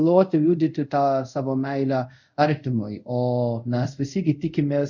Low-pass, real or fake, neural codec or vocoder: 7.2 kHz; fake; codec, 24 kHz, 0.5 kbps, DualCodec